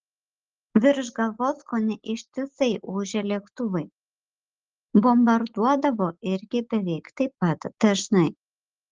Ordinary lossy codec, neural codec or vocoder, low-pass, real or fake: Opus, 16 kbps; none; 7.2 kHz; real